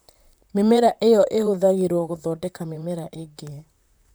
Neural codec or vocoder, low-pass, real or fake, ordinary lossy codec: vocoder, 44.1 kHz, 128 mel bands, Pupu-Vocoder; none; fake; none